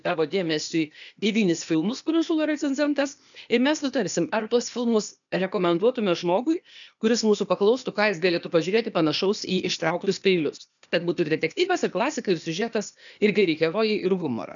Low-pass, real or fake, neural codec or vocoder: 7.2 kHz; fake; codec, 16 kHz, 0.8 kbps, ZipCodec